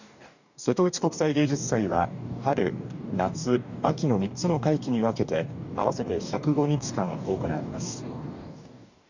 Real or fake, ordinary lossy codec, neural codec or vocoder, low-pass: fake; none; codec, 44.1 kHz, 2.6 kbps, DAC; 7.2 kHz